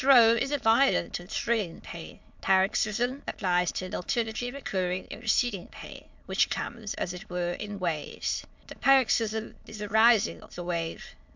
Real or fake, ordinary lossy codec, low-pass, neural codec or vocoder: fake; MP3, 64 kbps; 7.2 kHz; autoencoder, 22.05 kHz, a latent of 192 numbers a frame, VITS, trained on many speakers